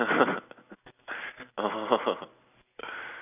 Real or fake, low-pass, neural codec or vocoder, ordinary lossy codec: real; 3.6 kHz; none; none